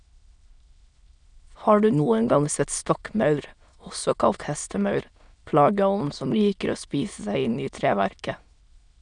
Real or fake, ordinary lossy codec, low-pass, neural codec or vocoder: fake; none; 9.9 kHz; autoencoder, 22.05 kHz, a latent of 192 numbers a frame, VITS, trained on many speakers